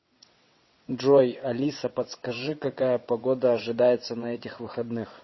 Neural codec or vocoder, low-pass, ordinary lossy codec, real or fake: vocoder, 22.05 kHz, 80 mel bands, WaveNeXt; 7.2 kHz; MP3, 24 kbps; fake